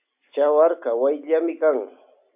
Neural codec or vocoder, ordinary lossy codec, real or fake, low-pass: none; AAC, 32 kbps; real; 3.6 kHz